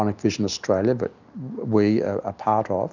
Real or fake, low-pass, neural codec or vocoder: real; 7.2 kHz; none